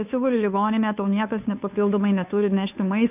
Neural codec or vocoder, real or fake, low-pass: codec, 16 kHz, 2 kbps, FunCodec, trained on Chinese and English, 25 frames a second; fake; 3.6 kHz